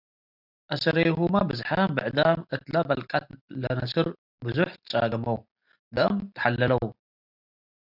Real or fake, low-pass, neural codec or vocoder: real; 5.4 kHz; none